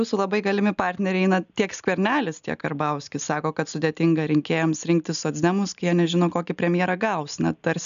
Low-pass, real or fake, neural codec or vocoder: 7.2 kHz; real; none